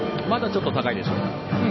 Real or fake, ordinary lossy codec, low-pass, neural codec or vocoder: real; MP3, 24 kbps; 7.2 kHz; none